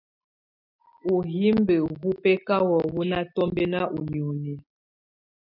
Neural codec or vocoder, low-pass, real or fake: none; 5.4 kHz; real